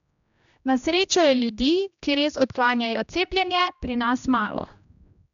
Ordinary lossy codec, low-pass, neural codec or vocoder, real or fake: none; 7.2 kHz; codec, 16 kHz, 1 kbps, X-Codec, HuBERT features, trained on general audio; fake